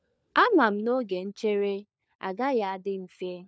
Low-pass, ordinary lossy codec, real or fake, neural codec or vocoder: none; none; fake; codec, 16 kHz, 4 kbps, FunCodec, trained on LibriTTS, 50 frames a second